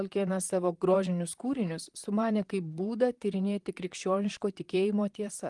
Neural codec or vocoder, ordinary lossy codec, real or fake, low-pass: vocoder, 44.1 kHz, 128 mel bands, Pupu-Vocoder; Opus, 24 kbps; fake; 10.8 kHz